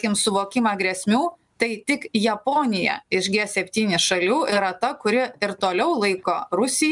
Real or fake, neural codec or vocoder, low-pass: real; none; 10.8 kHz